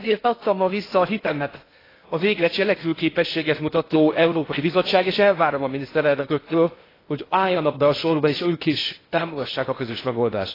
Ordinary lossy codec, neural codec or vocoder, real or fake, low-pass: AAC, 24 kbps; codec, 16 kHz in and 24 kHz out, 0.6 kbps, FocalCodec, streaming, 2048 codes; fake; 5.4 kHz